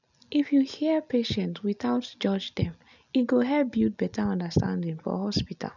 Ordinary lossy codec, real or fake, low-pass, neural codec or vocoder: none; real; 7.2 kHz; none